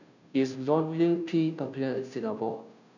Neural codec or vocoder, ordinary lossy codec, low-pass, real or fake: codec, 16 kHz, 0.5 kbps, FunCodec, trained on Chinese and English, 25 frames a second; none; 7.2 kHz; fake